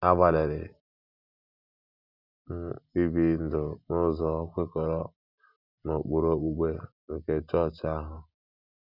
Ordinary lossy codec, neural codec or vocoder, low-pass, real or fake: none; none; 5.4 kHz; real